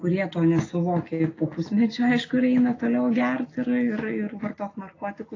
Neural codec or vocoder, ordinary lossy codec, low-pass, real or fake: none; AAC, 32 kbps; 7.2 kHz; real